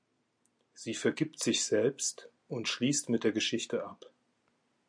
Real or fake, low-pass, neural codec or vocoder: real; 9.9 kHz; none